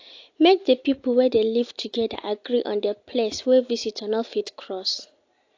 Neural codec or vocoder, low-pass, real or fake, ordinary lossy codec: none; 7.2 kHz; real; AAC, 48 kbps